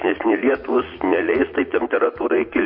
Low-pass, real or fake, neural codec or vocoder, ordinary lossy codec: 5.4 kHz; fake; vocoder, 44.1 kHz, 80 mel bands, Vocos; MP3, 32 kbps